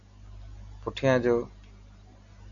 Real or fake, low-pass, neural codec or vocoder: real; 7.2 kHz; none